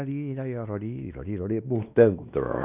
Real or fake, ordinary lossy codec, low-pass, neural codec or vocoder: fake; Opus, 64 kbps; 3.6 kHz; codec, 16 kHz, 2 kbps, X-Codec, WavLM features, trained on Multilingual LibriSpeech